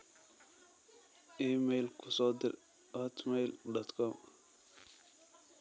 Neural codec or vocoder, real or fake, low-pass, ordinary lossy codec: none; real; none; none